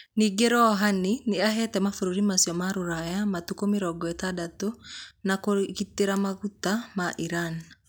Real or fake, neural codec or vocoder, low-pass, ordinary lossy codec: real; none; none; none